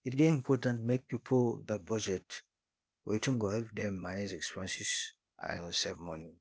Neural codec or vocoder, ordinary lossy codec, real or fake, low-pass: codec, 16 kHz, 0.8 kbps, ZipCodec; none; fake; none